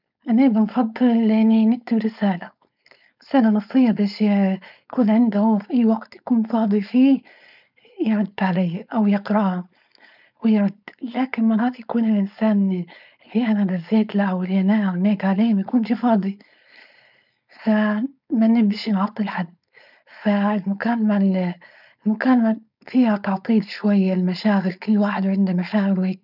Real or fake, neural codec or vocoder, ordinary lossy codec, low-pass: fake; codec, 16 kHz, 4.8 kbps, FACodec; none; 5.4 kHz